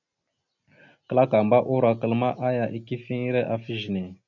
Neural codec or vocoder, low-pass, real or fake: none; 7.2 kHz; real